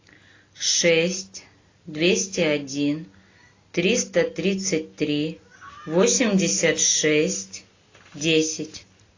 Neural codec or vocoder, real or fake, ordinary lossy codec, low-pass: none; real; AAC, 32 kbps; 7.2 kHz